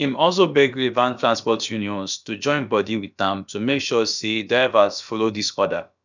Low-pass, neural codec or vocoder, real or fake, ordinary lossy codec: 7.2 kHz; codec, 16 kHz, about 1 kbps, DyCAST, with the encoder's durations; fake; none